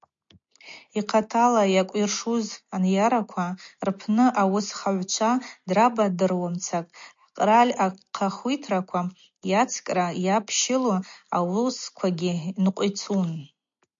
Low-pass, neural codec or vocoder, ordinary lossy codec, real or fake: 7.2 kHz; none; MP3, 48 kbps; real